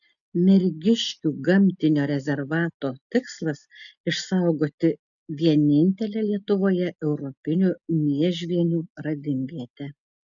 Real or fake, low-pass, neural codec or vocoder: real; 7.2 kHz; none